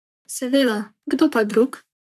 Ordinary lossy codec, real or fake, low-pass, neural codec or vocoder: none; fake; 14.4 kHz; codec, 44.1 kHz, 3.4 kbps, Pupu-Codec